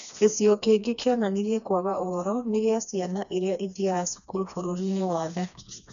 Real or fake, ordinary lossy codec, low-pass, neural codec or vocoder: fake; none; 7.2 kHz; codec, 16 kHz, 2 kbps, FreqCodec, smaller model